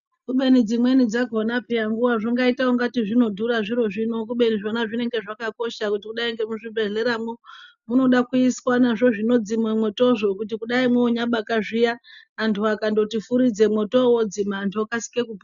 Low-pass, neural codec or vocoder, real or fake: 7.2 kHz; none; real